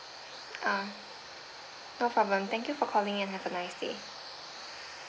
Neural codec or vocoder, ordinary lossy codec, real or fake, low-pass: none; none; real; none